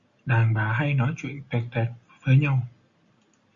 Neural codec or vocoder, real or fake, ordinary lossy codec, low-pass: none; real; Opus, 64 kbps; 7.2 kHz